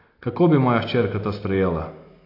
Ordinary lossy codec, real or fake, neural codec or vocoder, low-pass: AAC, 32 kbps; real; none; 5.4 kHz